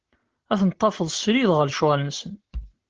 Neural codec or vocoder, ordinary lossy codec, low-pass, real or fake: none; Opus, 16 kbps; 7.2 kHz; real